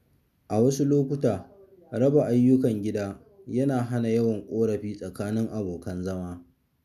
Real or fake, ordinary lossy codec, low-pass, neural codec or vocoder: real; none; 14.4 kHz; none